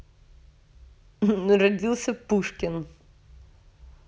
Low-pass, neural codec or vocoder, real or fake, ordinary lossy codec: none; none; real; none